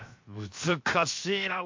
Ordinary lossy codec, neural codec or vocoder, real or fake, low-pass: MP3, 48 kbps; codec, 16 kHz, 0.7 kbps, FocalCodec; fake; 7.2 kHz